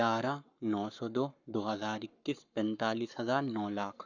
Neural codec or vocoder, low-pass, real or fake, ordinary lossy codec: codec, 44.1 kHz, 7.8 kbps, Pupu-Codec; 7.2 kHz; fake; none